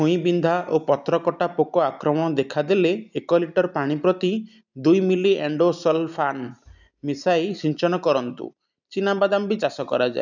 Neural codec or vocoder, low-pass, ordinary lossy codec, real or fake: none; 7.2 kHz; none; real